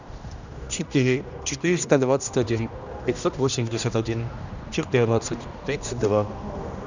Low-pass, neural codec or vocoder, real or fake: 7.2 kHz; codec, 16 kHz, 1 kbps, X-Codec, HuBERT features, trained on general audio; fake